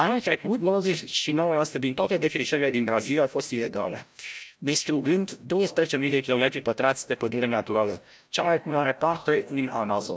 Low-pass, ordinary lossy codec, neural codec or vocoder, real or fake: none; none; codec, 16 kHz, 0.5 kbps, FreqCodec, larger model; fake